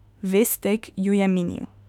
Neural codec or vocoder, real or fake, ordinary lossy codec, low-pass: autoencoder, 48 kHz, 32 numbers a frame, DAC-VAE, trained on Japanese speech; fake; none; 19.8 kHz